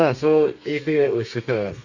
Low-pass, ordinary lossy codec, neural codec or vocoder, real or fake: 7.2 kHz; Opus, 64 kbps; codec, 32 kHz, 1.9 kbps, SNAC; fake